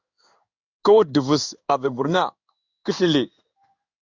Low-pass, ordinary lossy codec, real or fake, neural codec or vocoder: 7.2 kHz; Opus, 64 kbps; fake; codec, 16 kHz in and 24 kHz out, 1 kbps, XY-Tokenizer